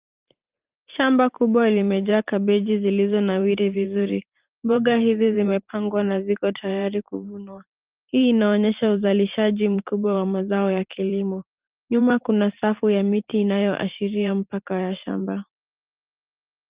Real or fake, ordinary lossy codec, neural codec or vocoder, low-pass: fake; Opus, 32 kbps; vocoder, 44.1 kHz, 128 mel bands every 512 samples, BigVGAN v2; 3.6 kHz